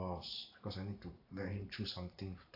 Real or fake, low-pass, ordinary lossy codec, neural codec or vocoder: fake; 5.4 kHz; AAC, 32 kbps; vocoder, 44.1 kHz, 128 mel bands every 512 samples, BigVGAN v2